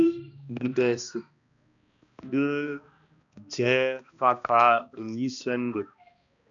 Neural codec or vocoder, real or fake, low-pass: codec, 16 kHz, 1 kbps, X-Codec, HuBERT features, trained on balanced general audio; fake; 7.2 kHz